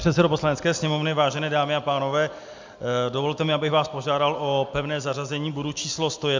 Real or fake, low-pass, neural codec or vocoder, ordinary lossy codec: real; 7.2 kHz; none; MP3, 64 kbps